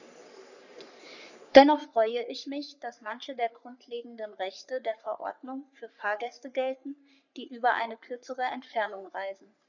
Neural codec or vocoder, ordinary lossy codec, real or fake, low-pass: codec, 44.1 kHz, 3.4 kbps, Pupu-Codec; none; fake; 7.2 kHz